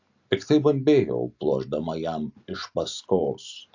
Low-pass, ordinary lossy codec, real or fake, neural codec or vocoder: 7.2 kHz; AAC, 48 kbps; real; none